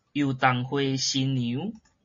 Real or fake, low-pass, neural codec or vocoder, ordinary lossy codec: real; 7.2 kHz; none; MP3, 32 kbps